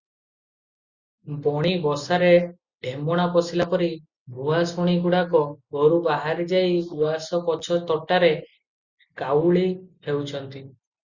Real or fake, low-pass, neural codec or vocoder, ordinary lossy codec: real; 7.2 kHz; none; Opus, 64 kbps